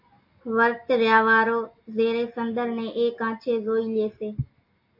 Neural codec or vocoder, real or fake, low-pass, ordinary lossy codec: none; real; 5.4 kHz; MP3, 32 kbps